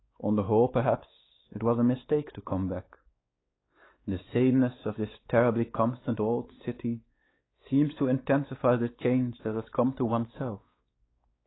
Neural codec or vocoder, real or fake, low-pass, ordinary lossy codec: codec, 16 kHz, 4 kbps, X-Codec, WavLM features, trained on Multilingual LibriSpeech; fake; 7.2 kHz; AAC, 16 kbps